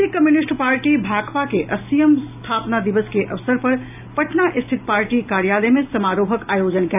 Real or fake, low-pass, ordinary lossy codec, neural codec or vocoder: real; 3.6 kHz; AAC, 32 kbps; none